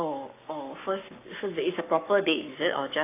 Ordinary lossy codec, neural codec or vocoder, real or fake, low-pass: AAC, 24 kbps; codec, 44.1 kHz, 7.8 kbps, Pupu-Codec; fake; 3.6 kHz